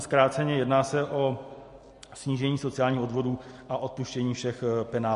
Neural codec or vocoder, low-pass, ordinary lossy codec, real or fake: none; 14.4 kHz; MP3, 48 kbps; real